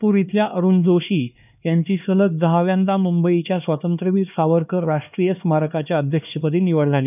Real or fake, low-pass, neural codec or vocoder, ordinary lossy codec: fake; 3.6 kHz; codec, 16 kHz, 2 kbps, X-Codec, HuBERT features, trained on LibriSpeech; none